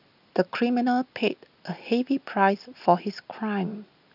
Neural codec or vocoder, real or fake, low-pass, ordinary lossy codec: none; real; 5.4 kHz; none